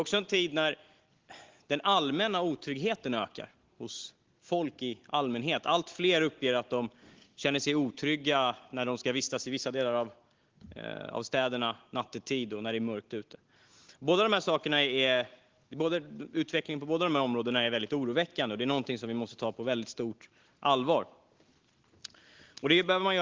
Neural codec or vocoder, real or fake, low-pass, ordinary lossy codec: none; real; 7.2 kHz; Opus, 16 kbps